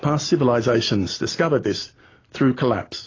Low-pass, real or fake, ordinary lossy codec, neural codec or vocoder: 7.2 kHz; real; AAC, 32 kbps; none